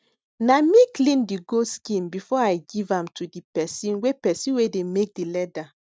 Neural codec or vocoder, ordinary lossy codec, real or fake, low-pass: none; none; real; none